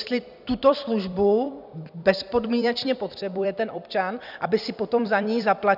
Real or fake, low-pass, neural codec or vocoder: fake; 5.4 kHz; vocoder, 44.1 kHz, 128 mel bands every 256 samples, BigVGAN v2